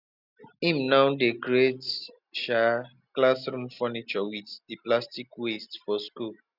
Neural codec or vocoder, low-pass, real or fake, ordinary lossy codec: none; 5.4 kHz; real; none